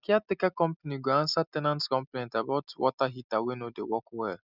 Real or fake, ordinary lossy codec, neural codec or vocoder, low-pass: real; none; none; 5.4 kHz